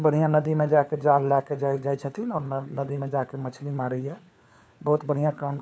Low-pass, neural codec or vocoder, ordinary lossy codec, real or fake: none; codec, 16 kHz, 4 kbps, FunCodec, trained on LibriTTS, 50 frames a second; none; fake